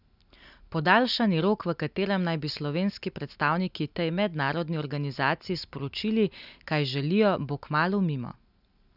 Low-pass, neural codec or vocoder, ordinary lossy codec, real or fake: 5.4 kHz; none; none; real